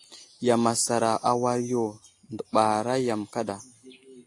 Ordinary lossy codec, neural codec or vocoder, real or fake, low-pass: AAC, 48 kbps; none; real; 10.8 kHz